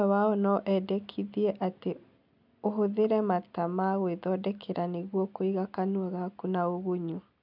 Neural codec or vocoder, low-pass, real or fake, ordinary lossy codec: none; 5.4 kHz; real; none